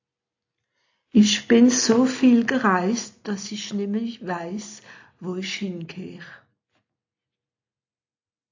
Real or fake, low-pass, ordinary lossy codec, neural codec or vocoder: real; 7.2 kHz; AAC, 48 kbps; none